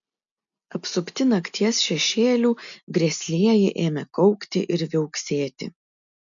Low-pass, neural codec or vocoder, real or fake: 7.2 kHz; none; real